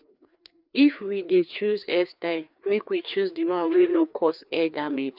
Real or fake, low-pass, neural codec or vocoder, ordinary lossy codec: fake; 5.4 kHz; codec, 16 kHz, 2 kbps, FreqCodec, larger model; none